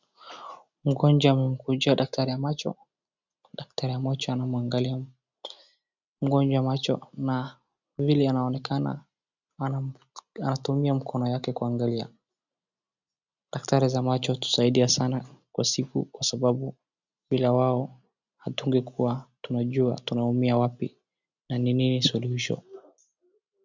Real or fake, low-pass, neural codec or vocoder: real; 7.2 kHz; none